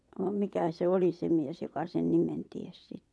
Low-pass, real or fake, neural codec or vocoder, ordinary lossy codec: none; fake; vocoder, 22.05 kHz, 80 mel bands, WaveNeXt; none